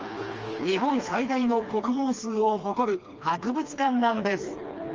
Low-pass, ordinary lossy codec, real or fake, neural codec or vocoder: 7.2 kHz; Opus, 24 kbps; fake; codec, 16 kHz, 2 kbps, FreqCodec, smaller model